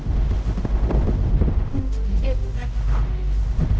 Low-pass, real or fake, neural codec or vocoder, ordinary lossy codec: none; fake; codec, 16 kHz, 0.5 kbps, X-Codec, HuBERT features, trained on general audio; none